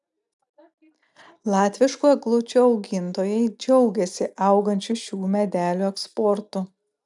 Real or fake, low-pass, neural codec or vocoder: real; 10.8 kHz; none